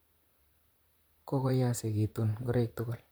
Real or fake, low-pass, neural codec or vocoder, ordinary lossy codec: fake; none; vocoder, 44.1 kHz, 128 mel bands, Pupu-Vocoder; none